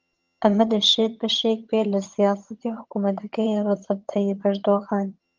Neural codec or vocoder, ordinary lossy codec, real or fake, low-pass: vocoder, 22.05 kHz, 80 mel bands, HiFi-GAN; Opus, 32 kbps; fake; 7.2 kHz